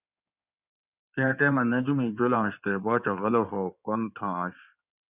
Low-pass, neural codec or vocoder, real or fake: 3.6 kHz; codec, 16 kHz in and 24 kHz out, 2.2 kbps, FireRedTTS-2 codec; fake